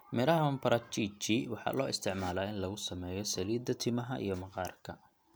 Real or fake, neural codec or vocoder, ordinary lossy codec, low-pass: real; none; none; none